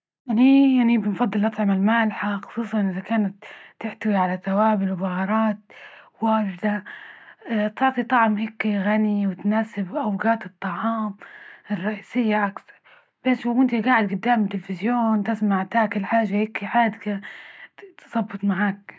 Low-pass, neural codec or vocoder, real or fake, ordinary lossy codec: none; none; real; none